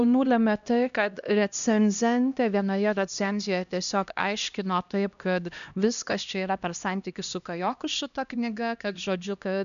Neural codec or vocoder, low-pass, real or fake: codec, 16 kHz, 1 kbps, X-Codec, HuBERT features, trained on LibriSpeech; 7.2 kHz; fake